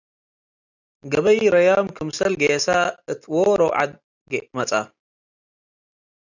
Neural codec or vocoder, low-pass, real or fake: none; 7.2 kHz; real